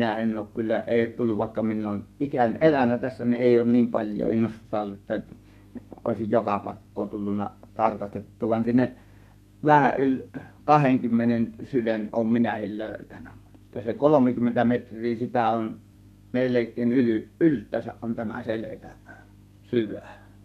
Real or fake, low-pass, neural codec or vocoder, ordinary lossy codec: fake; 14.4 kHz; codec, 32 kHz, 1.9 kbps, SNAC; none